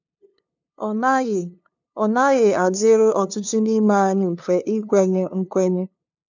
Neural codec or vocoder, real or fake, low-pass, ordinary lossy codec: codec, 16 kHz, 2 kbps, FunCodec, trained on LibriTTS, 25 frames a second; fake; 7.2 kHz; none